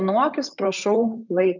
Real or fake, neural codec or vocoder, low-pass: fake; vocoder, 44.1 kHz, 128 mel bands, Pupu-Vocoder; 7.2 kHz